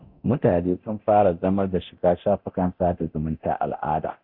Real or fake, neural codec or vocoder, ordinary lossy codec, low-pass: fake; codec, 24 kHz, 0.9 kbps, DualCodec; none; 5.4 kHz